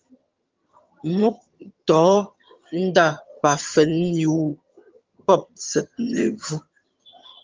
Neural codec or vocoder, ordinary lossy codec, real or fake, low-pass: vocoder, 22.05 kHz, 80 mel bands, HiFi-GAN; Opus, 32 kbps; fake; 7.2 kHz